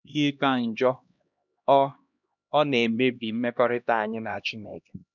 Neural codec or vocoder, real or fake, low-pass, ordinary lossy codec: codec, 16 kHz, 1 kbps, X-Codec, HuBERT features, trained on LibriSpeech; fake; 7.2 kHz; none